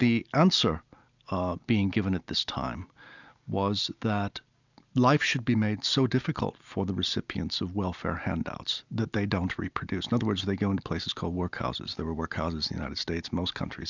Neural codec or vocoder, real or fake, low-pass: none; real; 7.2 kHz